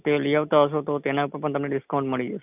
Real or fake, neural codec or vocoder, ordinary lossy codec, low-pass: real; none; none; 3.6 kHz